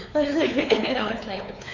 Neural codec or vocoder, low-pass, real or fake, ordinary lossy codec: codec, 16 kHz, 4 kbps, X-Codec, WavLM features, trained on Multilingual LibriSpeech; 7.2 kHz; fake; none